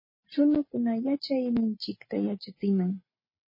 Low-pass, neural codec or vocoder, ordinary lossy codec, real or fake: 5.4 kHz; none; MP3, 24 kbps; real